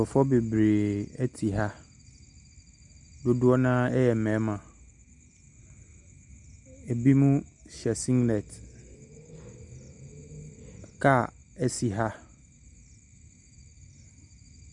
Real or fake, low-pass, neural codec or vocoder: real; 10.8 kHz; none